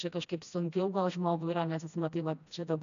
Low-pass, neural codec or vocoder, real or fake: 7.2 kHz; codec, 16 kHz, 1 kbps, FreqCodec, smaller model; fake